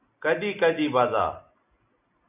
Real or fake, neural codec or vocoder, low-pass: real; none; 3.6 kHz